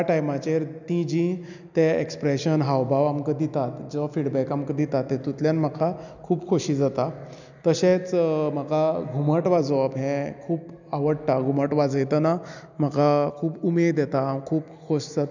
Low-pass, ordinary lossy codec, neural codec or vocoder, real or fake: 7.2 kHz; none; none; real